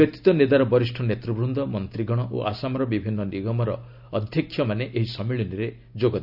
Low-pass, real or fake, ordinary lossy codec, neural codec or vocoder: 5.4 kHz; real; none; none